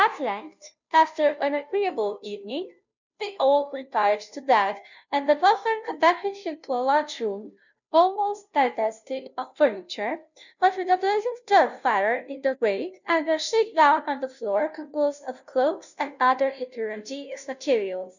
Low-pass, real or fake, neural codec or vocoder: 7.2 kHz; fake; codec, 16 kHz, 0.5 kbps, FunCodec, trained on Chinese and English, 25 frames a second